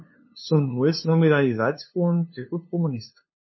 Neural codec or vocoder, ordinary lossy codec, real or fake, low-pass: codec, 16 kHz, 2 kbps, FunCodec, trained on LibriTTS, 25 frames a second; MP3, 24 kbps; fake; 7.2 kHz